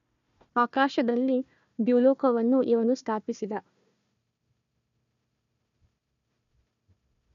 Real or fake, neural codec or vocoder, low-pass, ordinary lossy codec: fake; codec, 16 kHz, 1 kbps, FunCodec, trained on Chinese and English, 50 frames a second; 7.2 kHz; none